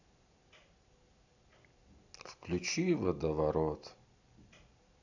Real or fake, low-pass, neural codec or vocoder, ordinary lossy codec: real; 7.2 kHz; none; none